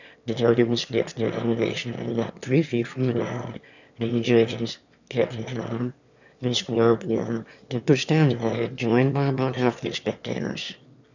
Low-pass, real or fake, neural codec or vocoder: 7.2 kHz; fake; autoencoder, 22.05 kHz, a latent of 192 numbers a frame, VITS, trained on one speaker